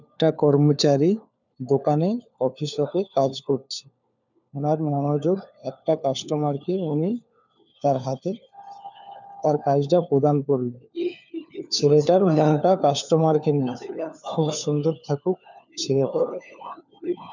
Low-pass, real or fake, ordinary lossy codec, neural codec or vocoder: 7.2 kHz; fake; none; codec, 16 kHz, 4 kbps, FunCodec, trained on LibriTTS, 50 frames a second